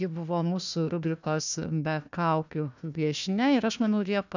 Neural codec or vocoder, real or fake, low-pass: codec, 16 kHz, 1 kbps, FunCodec, trained on Chinese and English, 50 frames a second; fake; 7.2 kHz